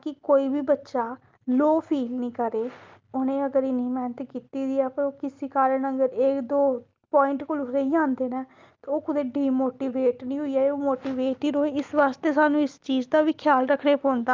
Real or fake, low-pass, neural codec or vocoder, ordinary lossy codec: real; 7.2 kHz; none; Opus, 24 kbps